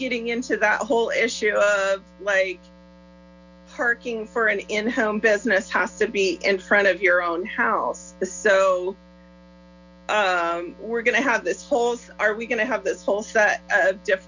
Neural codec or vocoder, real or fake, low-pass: none; real; 7.2 kHz